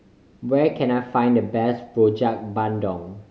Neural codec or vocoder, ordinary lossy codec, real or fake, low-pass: none; none; real; none